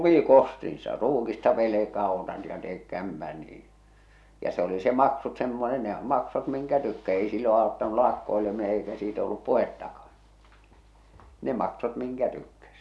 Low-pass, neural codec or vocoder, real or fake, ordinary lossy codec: none; none; real; none